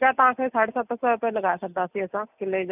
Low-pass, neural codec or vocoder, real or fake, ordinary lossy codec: 3.6 kHz; none; real; none